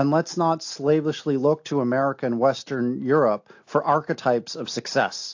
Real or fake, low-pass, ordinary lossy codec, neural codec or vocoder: real; 7.2 kHz; AAC, 48 kbps; none